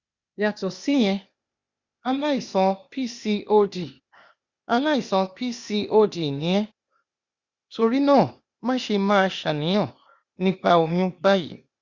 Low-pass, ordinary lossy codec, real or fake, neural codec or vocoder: 7.2 kHz; Opus, 64 kbps; fake; codec, 16 kHz, 0.8 kbps, ZipCodec